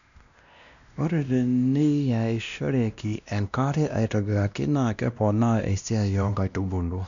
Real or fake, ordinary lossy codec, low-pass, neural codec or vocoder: fake; none; 7.2 kHz; codec, 16 kHz, 1 kbps, X-Codec, WavLM features, trained on Multilingual LibriSpeech